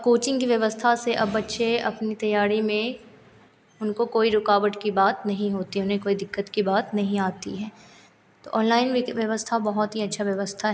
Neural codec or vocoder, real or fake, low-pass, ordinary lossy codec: none; real; none; none